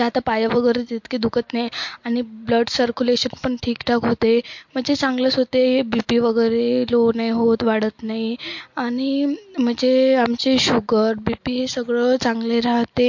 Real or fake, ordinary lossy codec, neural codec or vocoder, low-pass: real; MP3, 48 kbps; none; 7.2 kHz